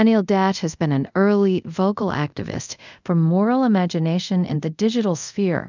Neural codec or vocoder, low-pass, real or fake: codec, 24 kHz, 0.5 kbps, DualCodec; 7.2 kHz; fake